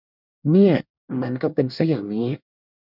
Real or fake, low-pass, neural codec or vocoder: fake; 5.4 kHz; codec, 24 kHz, 1 kbps, SNAC